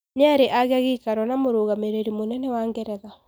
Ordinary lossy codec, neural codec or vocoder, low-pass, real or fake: none; none; none; real